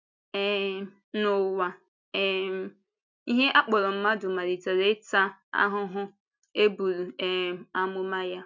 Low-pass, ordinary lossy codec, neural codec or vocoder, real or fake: 7.2 kHz; none; none; real